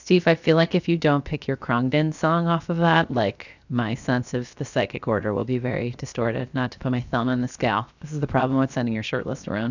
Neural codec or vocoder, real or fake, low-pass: codec, 16 kHz, 0.7 kbps, FocalCodec; fake; 7.2 kHz